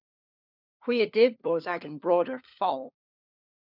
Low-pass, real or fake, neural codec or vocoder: 5.4 kHz; fake; codec, 16 kHz, 4 kbps, FunCodec, trained on LibriTTS, 50 frames a second